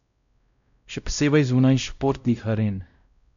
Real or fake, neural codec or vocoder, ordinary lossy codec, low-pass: fake; codec, 16 kHz, 0.5 kbps, X-Codec, WavLM features, trained on Multilingual LibriSpeech; none; 7.2 kHz